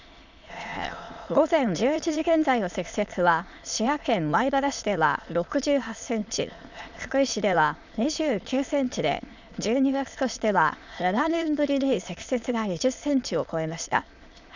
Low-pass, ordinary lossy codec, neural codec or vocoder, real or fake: 7.2 kHz; none; autoencoder, 22.05 kHz, a latent of 192 numbers a frame, VITS, trained on many speakers; fake